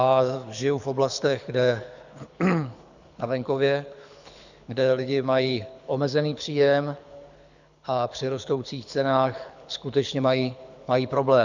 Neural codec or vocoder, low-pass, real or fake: codec, 24 kHz, 6 kbps, HILCodec; 7.2 kHz; fake